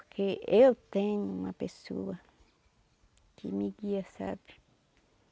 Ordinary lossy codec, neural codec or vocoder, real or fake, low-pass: none; none; real; none